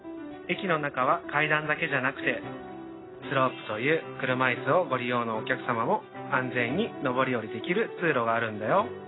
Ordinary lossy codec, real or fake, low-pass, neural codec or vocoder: AAC, 16 kbps; real; 7.2 kHz; none